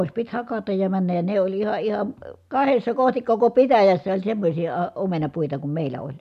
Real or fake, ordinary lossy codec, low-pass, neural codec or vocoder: real; none; 14.4 kHz; none